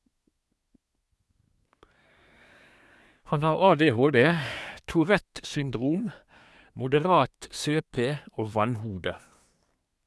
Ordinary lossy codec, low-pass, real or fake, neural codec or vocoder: none; none; fake; codec, 24 kHz, 1 kbps, SNAC